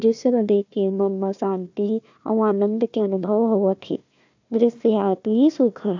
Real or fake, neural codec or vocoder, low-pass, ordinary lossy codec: fake; codec, 16 kHz, 1 kbps, FunCodec, trained on Chinese and English, 50 frames a second; 7.2 kHz; AAC, 48 kbps